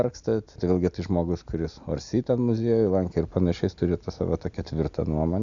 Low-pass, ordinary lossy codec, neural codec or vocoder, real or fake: 7.2 kHz; Opus, 64 kbps; none; real